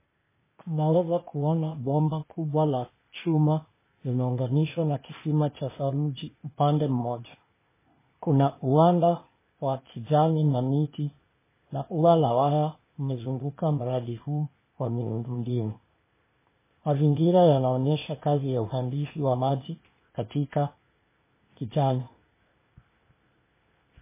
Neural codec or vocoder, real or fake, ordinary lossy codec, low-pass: codec, 16 kHz, 0.8 kbps, ZipCodec; fake; MP3, 16 kbps; 3.6 kHz